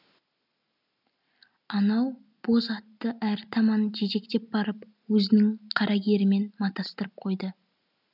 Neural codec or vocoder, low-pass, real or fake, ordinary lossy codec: none; 5.4 kHz; real; none